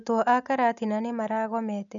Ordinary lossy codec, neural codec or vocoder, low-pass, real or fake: none; none; 7.2 kHz; real